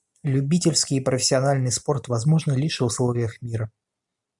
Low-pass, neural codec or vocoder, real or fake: 10.8 kHz; none; real